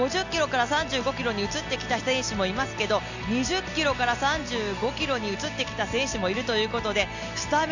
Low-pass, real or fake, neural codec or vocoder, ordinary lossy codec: 7.2 kHz; real; none; none